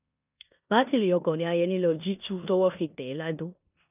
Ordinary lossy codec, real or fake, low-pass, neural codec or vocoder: none; fake; 3.6 kHz; codec, 16 kHz in and 24 kHz out, 0.9 kbps, LongCat-Audio-Codec, four codebook decoder